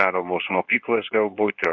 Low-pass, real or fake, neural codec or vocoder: 7.2 kHz; fake; codec, 24 kHz, 0.9 kbps, WavTokenizer, medium speech release version 2